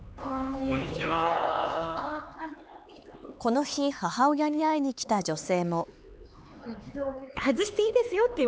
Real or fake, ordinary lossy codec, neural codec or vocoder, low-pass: fake; none; codec, 16 kHz, 4 kbps, X-Codec, HuBERT features, trained on LibriSpeech; none